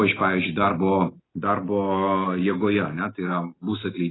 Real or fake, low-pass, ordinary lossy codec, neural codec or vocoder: real; 7.2 kHz; AAC, 16 kbps; none